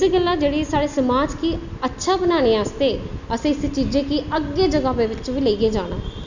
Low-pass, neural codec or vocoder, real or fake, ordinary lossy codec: 7.2 kHz; none; real; none